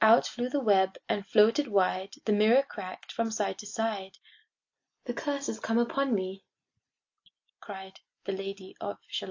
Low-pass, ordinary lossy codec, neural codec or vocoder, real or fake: 7.2 kHz; AAC, 48 kbps; none; real